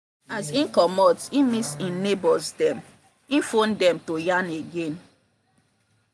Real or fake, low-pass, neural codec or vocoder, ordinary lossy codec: real; none; none; none